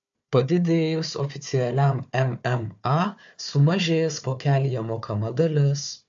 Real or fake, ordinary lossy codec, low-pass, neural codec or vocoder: fake; AAC, 64 kbps; 7.2 kHz; codec, 16 kHz, 4 kbps, FunCodec, trained on Chinese and English, 50 frames a second